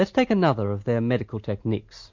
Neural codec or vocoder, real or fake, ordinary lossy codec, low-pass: none; real; MP3, 48 kbps; 7.2 kHz